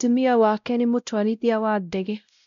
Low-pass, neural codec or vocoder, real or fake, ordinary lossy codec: 7.2 kHz; codec, 16 kHz, 0.5 kbps, X-Codec, WavLM features, trained on Multilingual LibriSpeech; fake; none